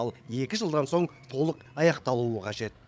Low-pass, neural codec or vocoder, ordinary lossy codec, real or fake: none; codec, 16 kHz, 8 kbps, FreqCodec, larger model; none; fake